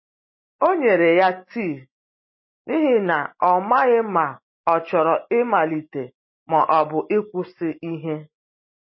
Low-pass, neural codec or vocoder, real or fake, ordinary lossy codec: 7.2 kHz; none; real; MP3, 24 kbps